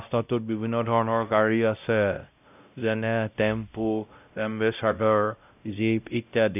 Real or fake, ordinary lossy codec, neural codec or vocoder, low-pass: fake; none; codec, 16 kHz, 0.5 kbps, X-Codec, WavLM features, trained on Multilingual LibriSpeech; 3.6 kHz